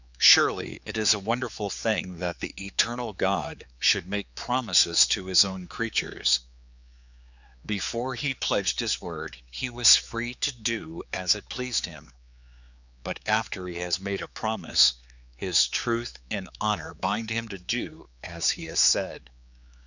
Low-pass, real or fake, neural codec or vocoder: 7.2 kHz; fake; codec, 16 kHz, 4 kbps, X-Codec, HuBERT features, trained on general audio